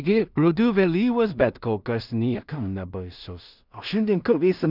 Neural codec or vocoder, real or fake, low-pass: codec, 16 kHz in and 24 kHz out, 0.4 kbps, LongCat-Audio-Codec, two codebook decoder; fake; 5.4 kHz